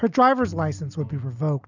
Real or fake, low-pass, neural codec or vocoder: real; 7.2 kHz; none